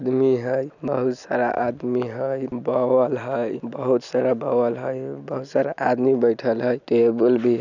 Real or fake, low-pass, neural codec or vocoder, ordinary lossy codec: real; 7.2 kHz; none; none